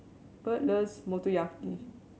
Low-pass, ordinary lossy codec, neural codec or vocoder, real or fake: none; none; none; real